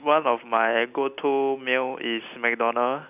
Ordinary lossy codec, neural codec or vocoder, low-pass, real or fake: none; none; 3.6 kHz; real